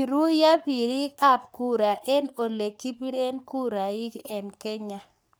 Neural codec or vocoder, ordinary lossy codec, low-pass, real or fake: codec, 44.1 kHz, 3.4 kbps, Pupu-Codec; none; none; fake